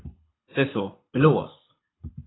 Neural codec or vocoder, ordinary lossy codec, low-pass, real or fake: codec, 24 kHz, 6 kbps, HILCodec; AAC, 16 kbps; 7.2 kHz; fake